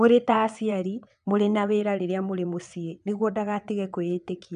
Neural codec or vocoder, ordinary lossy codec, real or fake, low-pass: vocoder, 22.05 kHz, 80 mel bands, WaveNeXt; none; fake; 9.9 kHz